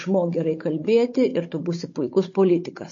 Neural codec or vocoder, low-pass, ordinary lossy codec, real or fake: codec, 16 kHz, 16 kbps, FunCodec, trained on Chinese and English, 50 frames a second; 7.2 kHz; MP3, 32 kbps; fake